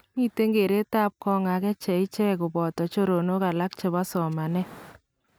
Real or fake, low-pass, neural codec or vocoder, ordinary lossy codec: real; none; none; none